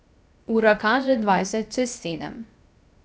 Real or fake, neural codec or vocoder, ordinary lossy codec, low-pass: fake; codec, 16 kHz, 0.7 kbps, FocalCodec; none; none